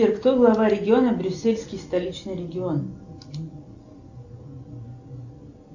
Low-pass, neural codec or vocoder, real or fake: 7.2 kHz; none; real